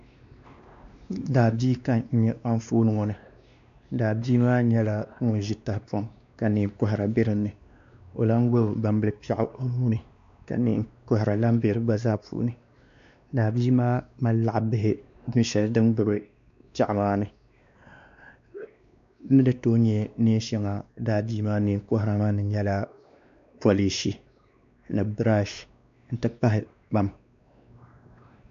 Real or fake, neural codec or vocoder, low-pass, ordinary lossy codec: fake; codec, 16 kHz, 2 kbps, X-Codec, WavLM features, trained on Multilingual LibriSpeech; 7.2 kHz; MP3, 64 kbps